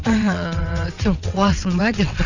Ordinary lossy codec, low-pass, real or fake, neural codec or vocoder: none; 7.2 kHz; fake; vocoder, 22.05 kHz, 80 mel bands, WaveNeXt